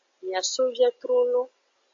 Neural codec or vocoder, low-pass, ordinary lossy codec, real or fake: none; 7.2 kHz; AAC, 48 kbps; real